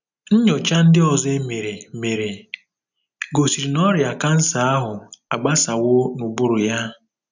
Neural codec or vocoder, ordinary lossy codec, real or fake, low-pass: none; none; real; 7.2 kHz